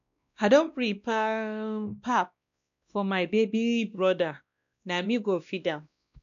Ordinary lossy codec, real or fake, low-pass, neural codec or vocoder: none; fake; 7.2 kHz; codec, 16 kHz, 1 kbps, X-Codec, WavLM features, trained on Multilingual LibriSpeech